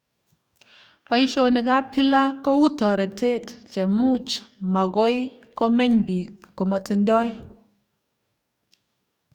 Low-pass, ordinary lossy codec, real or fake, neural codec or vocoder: 19.8 kHz; none; fake; codec, 44.1 kHz, 2.6 kbps, DAC